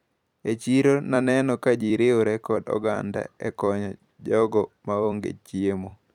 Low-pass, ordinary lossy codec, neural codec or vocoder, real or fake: 19.8 kHz; none; vocoder, 44.1 kHz, 128 mel bands every 256 samples, BigVGAN v2; fake